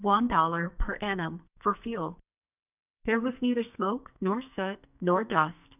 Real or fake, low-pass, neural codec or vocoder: fake; 3.6 kHz; codec, 44.1 kHz, 2.6 kbps, SNAC